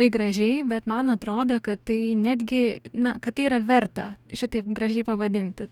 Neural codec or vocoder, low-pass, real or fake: codec, 44.1 kHz, 2.6 kbps, DAC; 19.8 kHz; fake